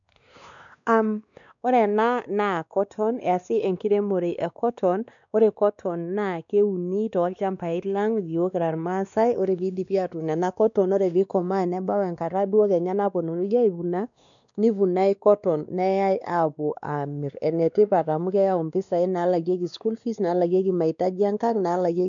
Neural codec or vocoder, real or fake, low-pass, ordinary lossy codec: codec, 16 kHz, 2 kbps, X-Codec, WavLM features, trained on Multilingual LibriSpeech; fake; 7.2 kHz; none